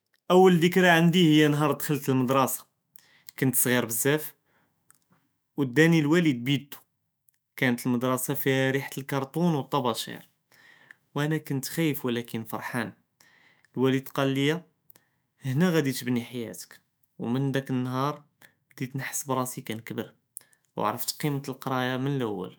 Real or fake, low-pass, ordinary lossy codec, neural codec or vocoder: fake; none; none; autoencoder, 48 kHz, 128 numbers a frame, DAC-VAE, trained on Japanese speech